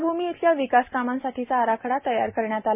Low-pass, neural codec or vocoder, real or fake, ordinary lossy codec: 3.6 kHz; none; real; none